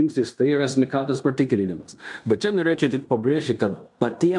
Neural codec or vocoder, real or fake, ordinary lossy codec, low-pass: codec, 16 kHz in and 24 kHz out, 0.9 kbps, LongCat-Audio-Codec, fine tuned four codebook decoder; fake; MP3, 96 kbps; 10.8 kHz